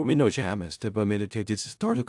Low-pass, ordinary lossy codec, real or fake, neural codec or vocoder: 10.8 kHz; AAC, 64 kbps; fake; codec, 16 kHz in and 24 kHz out, 0.4 kbps, LongCat-Audio-Codec, four codebook decoder